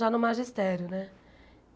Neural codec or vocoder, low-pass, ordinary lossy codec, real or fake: none; none; none; real